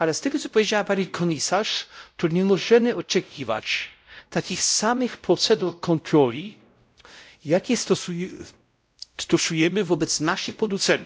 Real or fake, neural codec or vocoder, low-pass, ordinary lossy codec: fake; codec, 16 kHz, 0.5 kbps, X-Codec, WavLM features, trained on Multilingual LibriSpeech; none; none